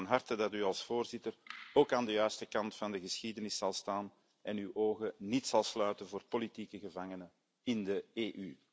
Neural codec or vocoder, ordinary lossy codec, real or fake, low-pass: none; none; real; none